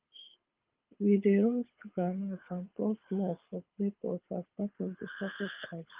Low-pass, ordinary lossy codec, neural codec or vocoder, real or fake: 3.6 kHz; Opus, 24 kbps; codec, 24 kHz, 6 kbps, HILCodec; fake